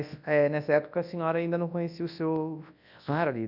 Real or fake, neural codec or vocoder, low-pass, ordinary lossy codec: fake; codec, 24 kHz, 0.9 kbps, WavTokenizer, large speech release; 5.4 kHz; none